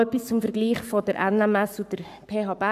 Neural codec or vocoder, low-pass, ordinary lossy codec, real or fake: codec, 44.1 kHz, 7.8 kbps, Pupu-Codec; 14.4 kHz; none; fake